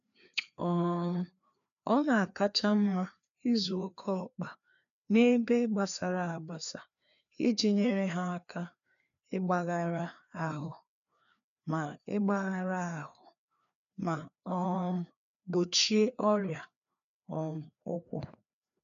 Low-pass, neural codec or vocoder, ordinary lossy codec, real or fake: 7.2 kHz; codec, 16 kHz, 2 kbps, FreqCodec, larger model; none; fake